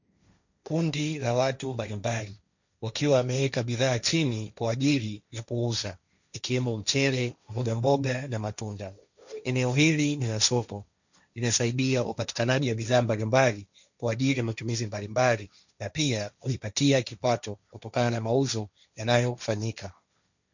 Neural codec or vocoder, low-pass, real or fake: codec, 16 kHz, 1.1 kbps, Voila-Tokenizer; 7.2 kHz; fake